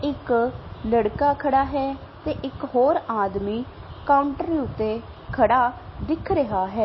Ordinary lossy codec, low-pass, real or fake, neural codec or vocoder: MP3, 24 kbps; 7.2 kHz; real; none